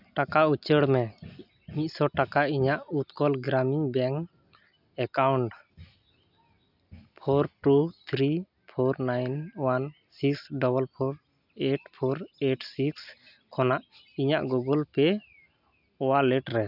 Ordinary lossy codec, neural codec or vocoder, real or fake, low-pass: none; none; real; 5.4 kHz